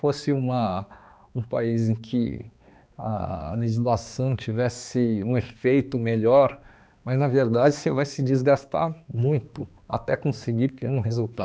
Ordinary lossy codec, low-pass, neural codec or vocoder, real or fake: none; none; codec, 16 kHz, 2 kbps, X-Codec, HuBERT features, trained on balanced general audio; fake